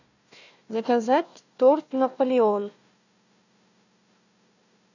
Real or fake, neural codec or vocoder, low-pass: fake; codec, 16 kHz, 1 kbps, FunCodec, trained on Chinese and English, 50 frames a second; 7.2 kHz